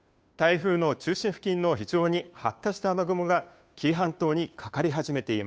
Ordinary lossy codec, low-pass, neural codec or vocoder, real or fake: none; none; codec, 16 kHz, 2 kbps, FunCodec, trained on Chinese and English, 25 frames a second; fake